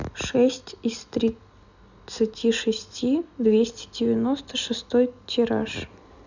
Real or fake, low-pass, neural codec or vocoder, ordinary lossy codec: real; 7.2 kHz; none; none